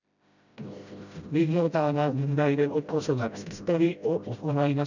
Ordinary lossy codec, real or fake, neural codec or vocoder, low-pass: none; fake; codec, 16 kHz, 0.5 kbps, FreqCodec, smaller model; 7.2 kHz